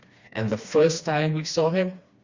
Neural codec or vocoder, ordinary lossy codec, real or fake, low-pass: codec, 16 kHz, 2 kbps, FreqCodec, smaller model; Opus, 64 kbps; fake; 7.2 kHz